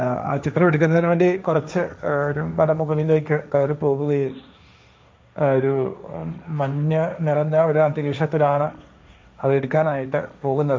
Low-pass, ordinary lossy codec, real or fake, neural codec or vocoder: none; none; fake; codec, 16 kHz, 1.1 kbps, Voila-Tokenizer